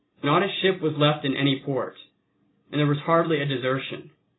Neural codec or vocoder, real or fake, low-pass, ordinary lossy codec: none; real; 7.2 kHz; AAC, 16 kbps